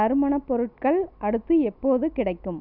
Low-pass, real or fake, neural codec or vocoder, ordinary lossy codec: 5.4 kHz; real; none; none